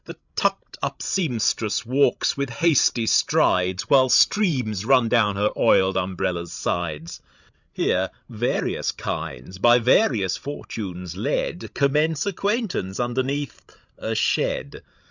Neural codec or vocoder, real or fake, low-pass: codec, 16 kHz, 16 kbps, FreqCodec, larger model; fake; 7.2 kHz